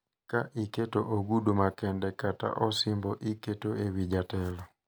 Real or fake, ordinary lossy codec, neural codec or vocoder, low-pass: real; none; none; none